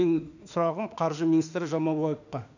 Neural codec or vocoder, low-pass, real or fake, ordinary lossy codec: autoencoder, 48 kHz, 32 numbers a frame, DAC-VAE, trained on Japanese speech; 7.2 kHz; fake; none